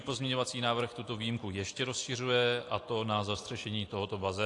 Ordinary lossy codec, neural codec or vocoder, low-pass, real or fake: AAC, 48 kbps; none; 10.8 kHz; real